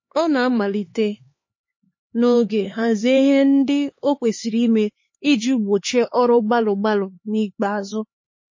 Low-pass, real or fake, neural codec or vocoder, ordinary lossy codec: 7.2 kHz; fake; codec, 16 kHz, 4 kbps, X-Codec, HuBERT features, trained on LibriSpeech; MP3, 32 kbps